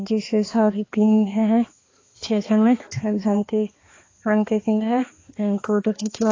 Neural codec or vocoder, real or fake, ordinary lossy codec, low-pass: codec, 16 kHz, 2 kbps, X-Codec, HuBERT features, trained on balanced general audio; fake; AAC, 32 kbps; 7.2 kHz